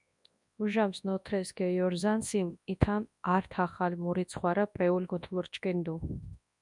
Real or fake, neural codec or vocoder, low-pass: fake; codec, 24 kHz, 0.9 kbps, WavTokenizer, large speech release; 10.8 kHz